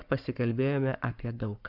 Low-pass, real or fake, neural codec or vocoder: 5.4 kHz; fake; codec, 44.1 kHz, 7.8 kbps, Pupu-Codec